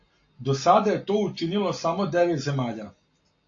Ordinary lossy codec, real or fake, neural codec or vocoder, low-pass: AAC, 48 kbps; real; none; 7.2 kHz